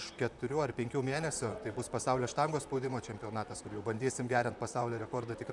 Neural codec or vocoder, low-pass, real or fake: vocoder, 44.1 kHz, 128 mel bands, Pupu-Vocoder; 10.8 kHz; fake